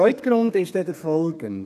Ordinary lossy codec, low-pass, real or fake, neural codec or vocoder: none; 14.4 kHz; fake; codec, 32 kHz, 1.9 kbps, SNAC